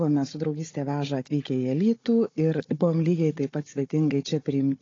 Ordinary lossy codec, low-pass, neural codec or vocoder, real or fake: AAC, 32 kbps; 7.2 kHz; codec, 16 kHz, 16 kbps, FunCodec, trained on Chinese and English, 50 frames a second; fake